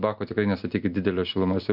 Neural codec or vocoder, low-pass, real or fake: none; 5.4 kHz; real